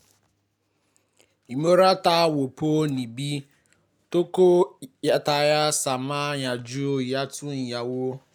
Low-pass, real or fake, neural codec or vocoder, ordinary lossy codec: none; real; none; none